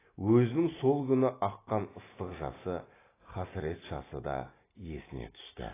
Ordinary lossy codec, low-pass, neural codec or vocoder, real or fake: AAC, 16 kbps; 3.6 kHz; none; real